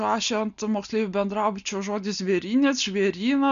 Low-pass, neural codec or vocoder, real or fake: 7.2 kHz; none; real